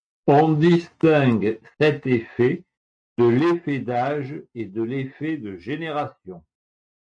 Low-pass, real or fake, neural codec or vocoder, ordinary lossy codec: 9.9 kHz; real; none; MP3, 64 kbps